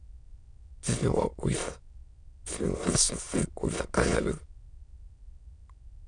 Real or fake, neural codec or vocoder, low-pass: fake; autoencoder, 22.05 kHz, a latent of 192 numbers a frame, VITS, trained on many speakers; 9.9 kHz